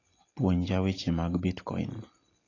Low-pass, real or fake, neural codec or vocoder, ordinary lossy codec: 7.2 kHz; real; none; AAC, 32 kbps